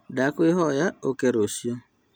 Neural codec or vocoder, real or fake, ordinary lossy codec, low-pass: none; real; none; none